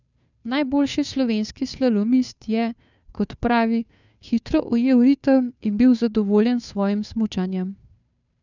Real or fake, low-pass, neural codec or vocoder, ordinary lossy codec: fake; 7.2 kHz; codec, 16 kHz, 2 kbps, FunCodec, trained on Chinese and English, 25 frames a second; none